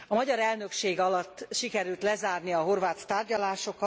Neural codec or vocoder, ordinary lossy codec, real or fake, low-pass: none; none; real; none